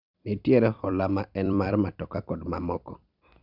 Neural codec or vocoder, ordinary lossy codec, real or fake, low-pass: vocoder, 44.1 kHz, 128 mel bands every 256 samples, BigVGAN v2; none; fake; 5.4 kHz